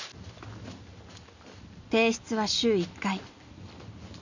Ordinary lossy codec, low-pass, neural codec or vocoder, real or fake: none; 7.2 kHz; none; real